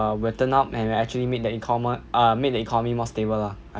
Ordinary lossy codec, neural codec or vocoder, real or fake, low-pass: none; none; real; none